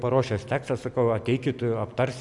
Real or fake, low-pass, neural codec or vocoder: real; 10.8 kHz; none